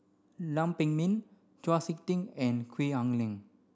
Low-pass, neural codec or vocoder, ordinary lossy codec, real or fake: none; none; none; real